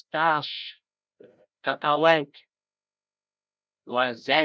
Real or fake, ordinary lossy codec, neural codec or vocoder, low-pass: fake; none; codec, 16 kHz, 0.5 kbps, FreqCodec, larger model; none